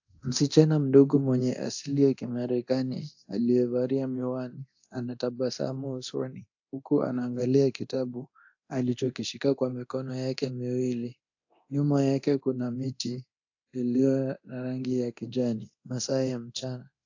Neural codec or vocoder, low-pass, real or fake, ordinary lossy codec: codec, 24 kHz, 0.9 kbps, DualCodec; 7.2 kHz; fake; AAC, 48 kbps